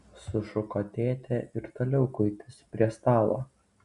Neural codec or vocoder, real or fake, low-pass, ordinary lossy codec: none; real; 10.8 kHz; AAC, 96 kbps